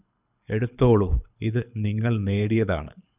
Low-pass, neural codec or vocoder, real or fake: 3.6 kHz; codec, 24 kHz, 6 kbps, HILCodec; fake